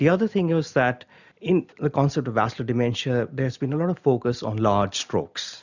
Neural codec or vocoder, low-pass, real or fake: none; 7.2 kHz; real